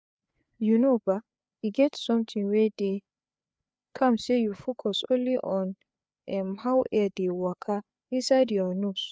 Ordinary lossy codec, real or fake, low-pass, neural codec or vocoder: none; fake; none; codec, 16 kHz, 4 kbps, FreqCodec, larger model